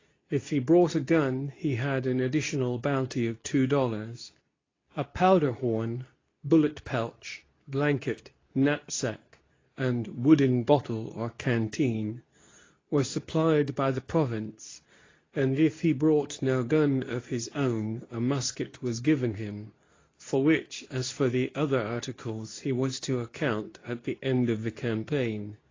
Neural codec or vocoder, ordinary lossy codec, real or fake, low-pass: codec, 24 kHz, 0.9 kbps, WavTokenizer, medium speech release version 2; AAC, 32 kbps; fake; 7.2 kHz